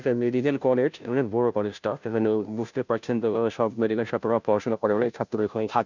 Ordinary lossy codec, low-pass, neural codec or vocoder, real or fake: none; 7.2 kHz; codec, 16 kHz, 0.5 kbps, FunCodec, trained on Chinese and English, 25 frames a second; fake